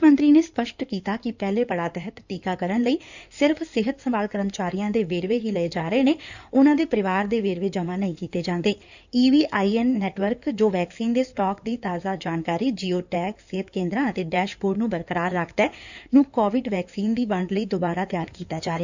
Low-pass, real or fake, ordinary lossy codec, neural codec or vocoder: 7.2 kHz; fake; none; codec, 16 kHz in and 24 kHz out, 2.2 kbps, FireRedTTS-2 codec